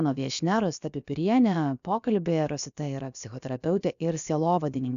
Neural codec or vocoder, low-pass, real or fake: codec, 16 kHz, about 1 kbps, DyCAST, with the encoder's durations; 7.2 kHz; fake